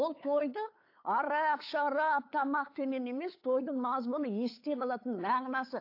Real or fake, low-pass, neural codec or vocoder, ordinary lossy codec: fake; 5.4 kHz; codec, 16 kHz, 8 kbps, FunCodec, trained on LibriTTS, 25 frames a second; AAC, 32 kbps